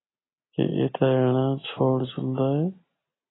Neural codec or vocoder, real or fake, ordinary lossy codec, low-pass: none; real; AAC, 16 kbps; 7.2 kHz